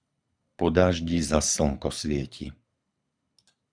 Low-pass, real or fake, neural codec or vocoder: 9.9 kHz; fake; codec, 24 kHz, 6 kbps, HILCodec